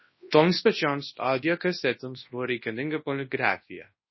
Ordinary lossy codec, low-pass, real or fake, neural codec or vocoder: MP3, 24 kbps; 7.2 kHz; fake; codec, 24 kHz, 0.9 kbps, WavTokenizer, large speech release